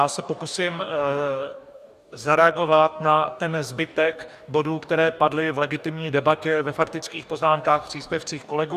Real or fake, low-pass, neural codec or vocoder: fake; 14.4 kHz; codec, 44.1 kHz, 2.6 kbps, DAC